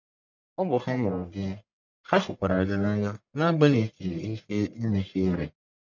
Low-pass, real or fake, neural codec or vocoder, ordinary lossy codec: 7.2 kHz; fake; codec, 44.1 kHz, 1.7 kbps, Pupu-Codec; none